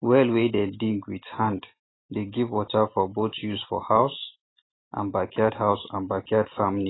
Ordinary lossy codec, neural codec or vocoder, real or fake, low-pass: AAC, 16 kbps; none; real; 7.2 kHz